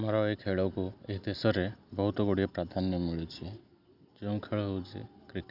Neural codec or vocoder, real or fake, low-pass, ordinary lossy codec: none; real; 5.4 kHz; none